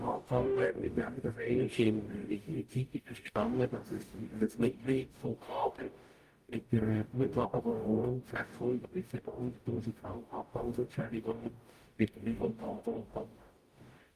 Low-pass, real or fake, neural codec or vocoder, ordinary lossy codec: 14.4 kHz; fake; codec, 44.1 kHz, 0.9 kbps, DAC; Opus, 32 kbps